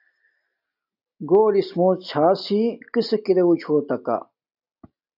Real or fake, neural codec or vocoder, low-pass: real; none; 5.4 kHz